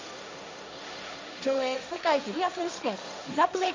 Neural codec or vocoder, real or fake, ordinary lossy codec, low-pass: codec, 16 kHz, 1.1 kbps, Voila-Tokenizer; fake; MP3, 64 kbps; 7.2 kHz